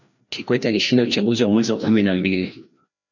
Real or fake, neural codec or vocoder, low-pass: fake; codec, 16 kHz, 1 kbps, FreqCodec, larger model; 7.2 kHz